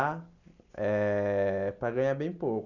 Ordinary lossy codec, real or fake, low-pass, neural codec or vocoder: none; real; 7.2 kHz; none